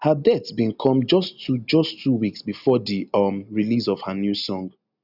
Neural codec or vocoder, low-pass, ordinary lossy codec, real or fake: none; 5.4 kHz; none; real